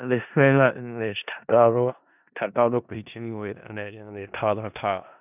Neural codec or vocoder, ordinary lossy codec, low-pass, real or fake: codec, 16 kHz in and 24 kHz out, 0.4 kbps, LongCat-Audio-Codec, four codebook decoder; none; 3.6 kHz; fake